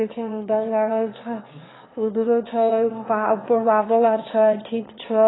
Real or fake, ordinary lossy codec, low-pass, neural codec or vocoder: fake; AAC, 16 kbps; 7.2 kHz; autoencoder, 22.05 kHz, a latent of 192 numbers a frame, VITS, trained on one speaker